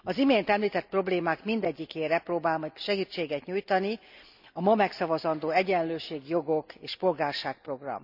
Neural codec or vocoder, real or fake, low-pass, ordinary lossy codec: none; real; 5.4 kHz; none